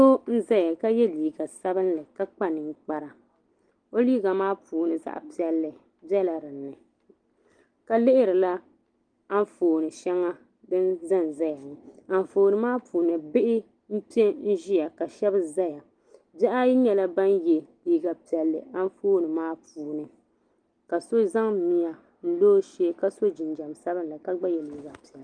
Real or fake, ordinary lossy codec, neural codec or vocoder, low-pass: real; Opus, 24 kbps; none; 9.9 kHz